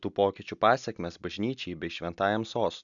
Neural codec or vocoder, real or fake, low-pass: none; real; 7.2 kHz